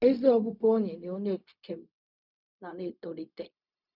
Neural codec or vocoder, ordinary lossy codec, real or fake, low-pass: codec, 16 kHz, 0.4 kbps, LongCat-Audio-Codec; none; fake; 5.4 kHz